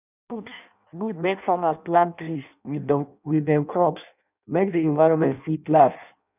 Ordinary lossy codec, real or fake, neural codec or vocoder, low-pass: none; fake; codec, 16 kHz in and 24 kHz out, 0.6 kbps, FireRedTTS-2 codec; 3.6 kHz